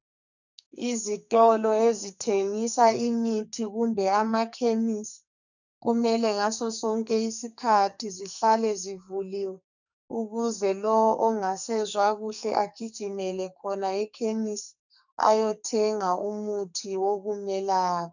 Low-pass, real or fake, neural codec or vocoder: 7.2 kHz; fake; codec, 44.1 kHz, 2.6 kbps, SNAC